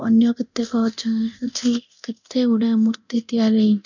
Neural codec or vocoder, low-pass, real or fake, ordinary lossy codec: codec, 24 kHz, 0.9 kbps, DualCodec; 7.2 kHz; fake; none